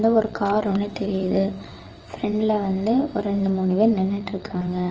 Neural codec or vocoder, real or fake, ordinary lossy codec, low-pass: vocoder, 22.05 kHz, 80 mel bands, WaveNeXt; fake; Opus, 24 kbps; 7.2 kHz